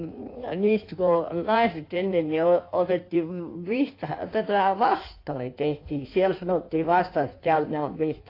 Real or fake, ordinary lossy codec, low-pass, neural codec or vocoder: fake; AAC, 32 kbps; 5.4 kHz; codec, 16 kHz in and 24 kHz out, 1.1 kbps, FireRedTTS-2 codec